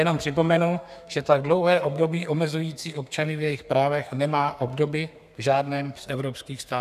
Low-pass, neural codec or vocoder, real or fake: 14.4 kHz; codec, 32 kHz, 1.9 kbps, SNAC; fake